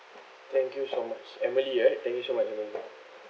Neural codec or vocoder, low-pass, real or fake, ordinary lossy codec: none; none; real; none